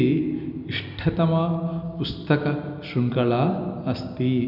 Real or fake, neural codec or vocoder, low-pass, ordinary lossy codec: real; none; 5.4 kHz; none